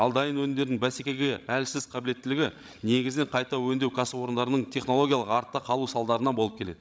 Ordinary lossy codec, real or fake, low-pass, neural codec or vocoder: none; fake; none; codec, 16 kHz, 16 kbps, FreqCodec, larger model